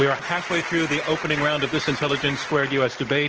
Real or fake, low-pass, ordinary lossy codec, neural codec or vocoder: real; 7.2 kHz; Opus, 16 kbps; none